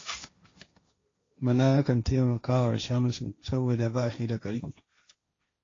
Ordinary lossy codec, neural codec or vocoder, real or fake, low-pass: AAC, 32 kbps; codec, 16 kHz, 1.1 kbps, Voila-Tokenizer; fake; 7.2 kHz